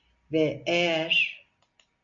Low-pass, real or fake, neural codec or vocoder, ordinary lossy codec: 7.2 kHz; real; none; MP3, 64 kbps